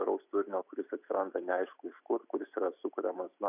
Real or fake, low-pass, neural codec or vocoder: real; 3.6 kHz; none